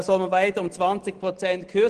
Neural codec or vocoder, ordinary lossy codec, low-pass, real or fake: none; Opus, 16 kbps; 9.9 kHz; real